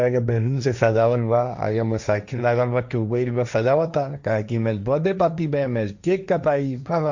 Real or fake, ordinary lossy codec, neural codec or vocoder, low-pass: fake; Opus, 64 kbps; codec, 16 kHz, 1.1 kbps, Voila-Tokenizer; 7.2 kHz